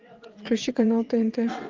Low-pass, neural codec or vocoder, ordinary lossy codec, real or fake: 7.2 kHz; vocoder, 22.05 kHz, 80 mel bands, WaveNeXt; Opus, 32 kbps; fake